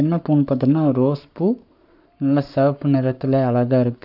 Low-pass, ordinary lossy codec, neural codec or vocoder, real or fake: 5.4 kHz; none; codec, 44.1 kHz, 7.8 kbps, Pupu-Codec; fake